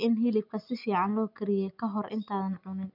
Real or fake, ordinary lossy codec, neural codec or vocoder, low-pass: real; none; none; 5.4 kHz